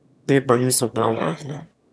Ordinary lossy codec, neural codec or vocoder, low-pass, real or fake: none; autoencoder, 22.05 kHz, a latent of 192 numbers a frame, VITS, trained on one speaker; none; fake